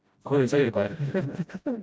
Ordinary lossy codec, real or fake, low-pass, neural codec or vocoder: none; fake; none; codec, 16 kHz, 0.5 kbps, FreqCodec, smaller model